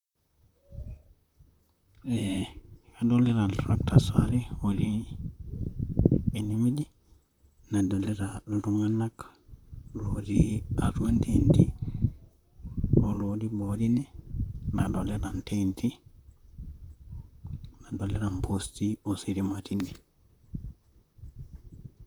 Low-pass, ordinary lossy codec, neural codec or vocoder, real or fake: 19.8 kHz; none; vocoder, 44.1 kHz, 128 mel bands, Pupu-Vocoder; fake